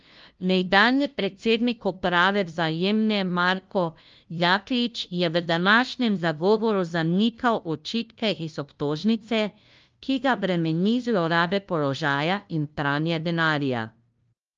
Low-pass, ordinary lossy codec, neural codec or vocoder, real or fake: 7.2 kHz; Opus, 24 kbps; codec, 16 kHz, 0.5 kbps, FunCodec, trained on LibriTTS, 25 frames a second; fake